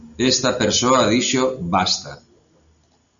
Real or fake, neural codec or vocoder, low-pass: real; none; 7.2 kHz